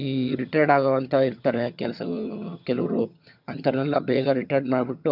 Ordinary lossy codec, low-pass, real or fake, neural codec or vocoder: none; 5.4 kHz; fake; vocoder, 22.05 kHz, 80 mel bands, HiFi-GAN